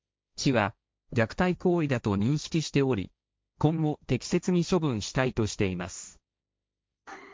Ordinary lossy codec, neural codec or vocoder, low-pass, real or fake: none; codec, 16 kHz, 1.1 kbps, Voila-Tokenizer; none; fake